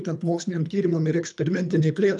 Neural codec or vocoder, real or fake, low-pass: codec, 24 kHz, 3 kbps, HILCodec; fake; 10.8 kHz